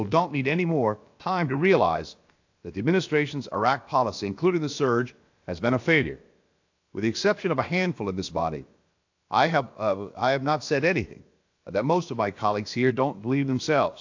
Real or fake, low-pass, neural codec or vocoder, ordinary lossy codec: fake; 7.2 kHz; codec, 16 kHz, about 1 kbps, DyCAST, with the encoder's durations; AAC, 48 kbps